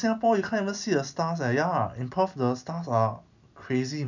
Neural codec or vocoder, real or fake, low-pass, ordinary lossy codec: none; real; 7.2 kHz; none